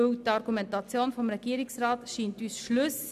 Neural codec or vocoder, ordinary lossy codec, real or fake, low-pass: none; none; real; 14.4 kHz